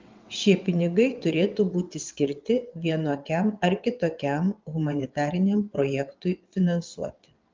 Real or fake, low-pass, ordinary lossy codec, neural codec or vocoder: fake; 7.2 kHz; Opus, 24 kbps; vocoder, 22.05 kHz, 80 mel bands, WaveNeXt